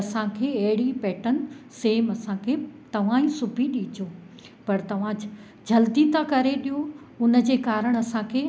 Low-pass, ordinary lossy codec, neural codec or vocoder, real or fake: none; none; none; real